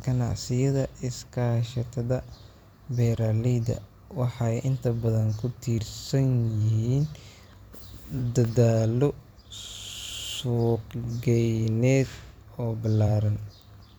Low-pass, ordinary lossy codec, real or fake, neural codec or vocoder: none; none; fake; vocoder, 44.1 kHz, 128 mel bands every 512 samples, BigVGAN v2